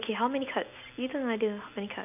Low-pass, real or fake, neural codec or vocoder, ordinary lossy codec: 3.6 kHz; real; none; none